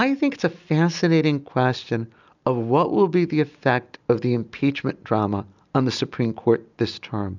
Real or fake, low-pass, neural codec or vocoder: real; 7.2 kHz; none